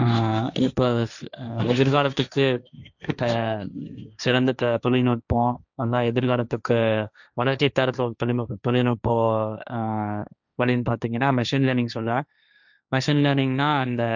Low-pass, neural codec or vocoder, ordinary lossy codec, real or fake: 7.2 kHz; codec, 16 kHz, 1.1 kbps, Voila-Tokenizer; none; fake